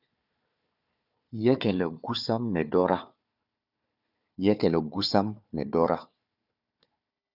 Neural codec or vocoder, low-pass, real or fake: codec, 16 kHz, 4 kbps, FunCodec, trained on Chinese and English, 50 frames a second; 5.4 kHz; fake